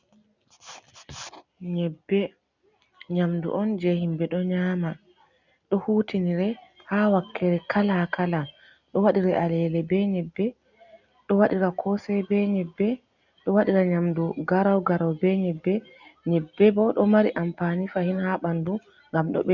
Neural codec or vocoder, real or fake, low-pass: none; real; 7.2 kHz